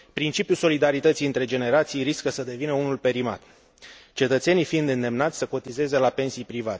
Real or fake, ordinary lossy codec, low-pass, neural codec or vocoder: real; none; none; none